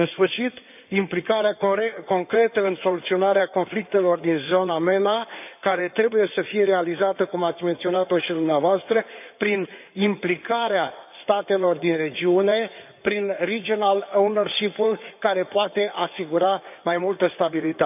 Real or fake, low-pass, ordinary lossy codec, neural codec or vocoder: fake; 3.6 kHz; none; codec, 16 kHz in and 24 kHz out, 2.2 kbps, FireRedTTS-2 codec